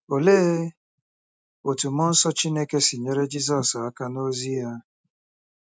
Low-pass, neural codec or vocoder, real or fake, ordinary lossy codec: none; none; real; none